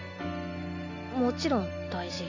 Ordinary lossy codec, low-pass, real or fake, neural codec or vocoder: none; 7.2 kHz; real; none